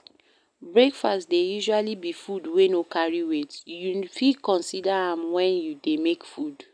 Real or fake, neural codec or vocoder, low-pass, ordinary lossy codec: real; none; 9.9 kHz; none